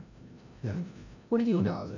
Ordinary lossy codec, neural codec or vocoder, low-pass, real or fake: none; codec, 16 kHz, 0.5 kbps, FreqCodec, larger model; 7.2 kHz; fake